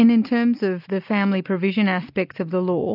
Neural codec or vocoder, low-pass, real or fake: none; 5.4 kHz; real